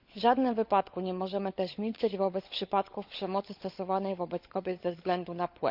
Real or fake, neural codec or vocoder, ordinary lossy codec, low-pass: fake; codec, 16 kHz, 16 kbps, FunCodec, trained on LibriTTS, 50 frames a second; Opus, 64 kbps; 5.4 kHz